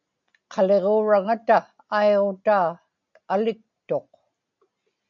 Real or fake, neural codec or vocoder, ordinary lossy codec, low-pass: real; none; AAC, 64 kbps; 7.2 kHz